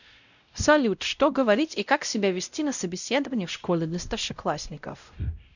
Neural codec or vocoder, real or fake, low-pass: codec, 16 kHz, 0.5 kbps, X-Codec, WavLM features, trained on Multilingual LibriSpeech; fake; 7.2 kHz